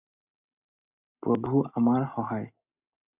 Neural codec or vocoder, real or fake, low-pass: none; real; 3.6 kHz